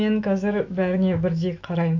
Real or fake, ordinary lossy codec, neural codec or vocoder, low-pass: fake; AAC, 48 kbps; autoencoder, 48 kHz, 128 numbers a frame, DAC-VAE, trained on Japanese speech; 7.2 kHz